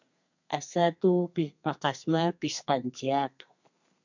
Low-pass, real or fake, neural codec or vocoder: 7.2 kHz; fake; codec, 32 kHz, 1.9 kbps, SNAC